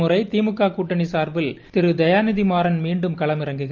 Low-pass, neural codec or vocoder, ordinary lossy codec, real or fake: 7.2 kHz; none; Opus, 24 kbps; real